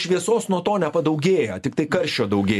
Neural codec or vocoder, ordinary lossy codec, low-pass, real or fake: none; MP3, 96 kbps; 14.4 kHz; real